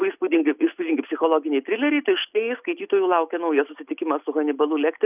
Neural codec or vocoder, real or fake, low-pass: none; real; 3.6 kHz